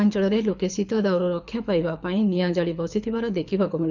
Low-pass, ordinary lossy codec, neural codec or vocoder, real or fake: 7.2 kHz; none; codec, 24 kHz, 6 kbps, HILCodec; fake